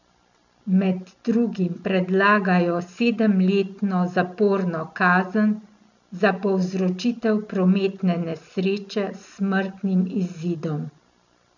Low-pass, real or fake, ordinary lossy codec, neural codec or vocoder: 7.2 kHz; real; none; none